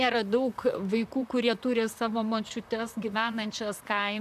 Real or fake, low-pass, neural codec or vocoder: fake; 14.4 kHz; vocoder, 44.1 kHz, 128 mel bands, Pupu-Vocoder